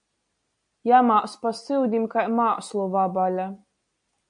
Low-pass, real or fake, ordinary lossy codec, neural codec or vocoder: 9.9 kHz; real; MP3, 64 kbps; none